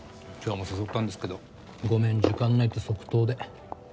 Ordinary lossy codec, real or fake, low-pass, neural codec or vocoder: none; real; none; none